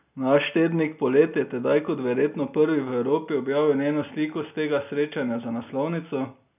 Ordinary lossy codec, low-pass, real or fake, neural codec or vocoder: none; 3.6 kHz; real; none